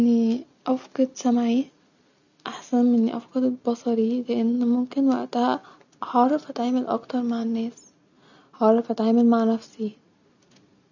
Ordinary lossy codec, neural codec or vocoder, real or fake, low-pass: none; none; real; 7.2 kHz